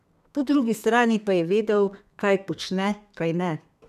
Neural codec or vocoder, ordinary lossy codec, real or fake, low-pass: codec, 32 kHz, 1.9 kbps, SNAC; none; fake; 14.4 kHz